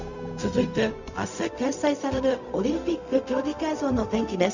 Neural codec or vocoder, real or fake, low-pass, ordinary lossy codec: codec, 16 kHz, 0.4 kbps, LongCat-Audio-Codec; fake; 7.2 kHz; none